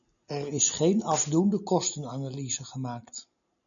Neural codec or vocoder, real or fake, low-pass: none; real; 7.2 kHz